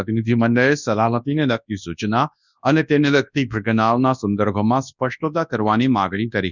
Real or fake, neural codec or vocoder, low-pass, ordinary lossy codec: fake; codec, 24 kHz, 0.9 kbps, WavTokenizer, large speech release; 7.2 kHz; none